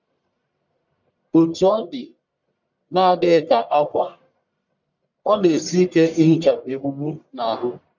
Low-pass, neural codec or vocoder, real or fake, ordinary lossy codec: 7.2 kHz; codec, 44.1 kHz, 1.7 kbps, Pupu-Codec; fake; none